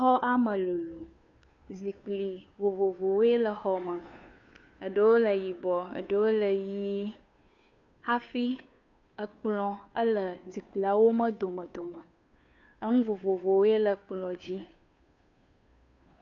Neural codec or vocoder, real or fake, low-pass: codec, 16 kHz, 2 kbps, FunCodec, trained on Chinese and English, 25 frames a second; fake; 7.2 kHz